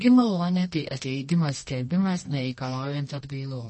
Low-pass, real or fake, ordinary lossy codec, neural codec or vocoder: 10.8 kHz; fake; MP3, 32 kbps; codec, 24 kHz, 0.9 kbps, WavTokenizer, medium music audio release